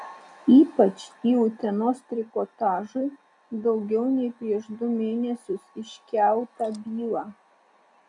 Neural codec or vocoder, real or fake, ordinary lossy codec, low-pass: none; real; MP3, 96 kbps; 10.8 kHz